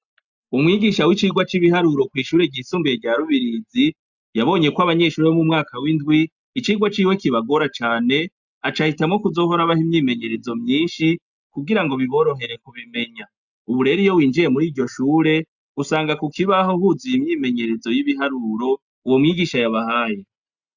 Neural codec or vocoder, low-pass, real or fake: none; 7.2 kHz; real